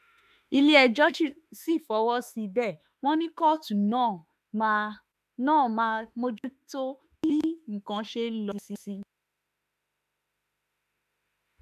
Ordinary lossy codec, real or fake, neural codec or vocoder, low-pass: none; fake; autoencoder, 48 kHz, 32 numbers a frame, DAC-VAE, trained on Japanese speech; 14.4 kHz